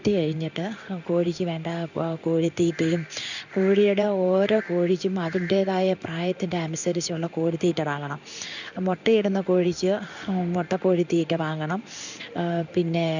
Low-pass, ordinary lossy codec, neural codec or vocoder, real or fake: 7.2 kHz; none; codec, 16 kHz in and 24 kHz out, 1 kbps, XY-Tokenizer; fake